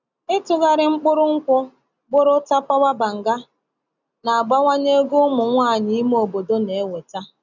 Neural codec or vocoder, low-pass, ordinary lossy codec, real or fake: none; 7.2 kHz; none; real